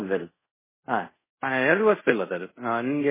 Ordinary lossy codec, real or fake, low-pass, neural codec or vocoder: MP3, 16 kbps; fake; 3.6 kHz; codec, 24 kHz, 0.5 kbps, DualCodec